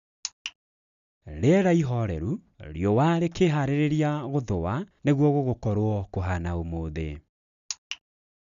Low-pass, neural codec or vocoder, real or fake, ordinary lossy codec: 7.2 kHz; none; real; none